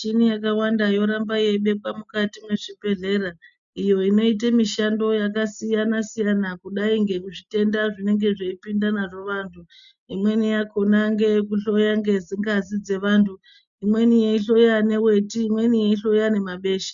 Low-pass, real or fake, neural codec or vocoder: 7.2 kHz; real; none